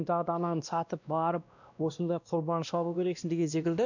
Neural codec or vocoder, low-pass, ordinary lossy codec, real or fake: codec, 16 kHz, 1 kbps, X-Codec, WavLM features, trained on Multilingual LibriSpeech; 7.2 kHz; none; fake